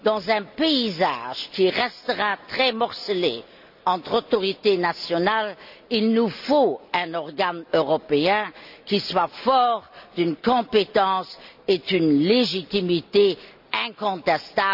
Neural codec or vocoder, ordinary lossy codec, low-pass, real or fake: none; none; 5.4 kHz; real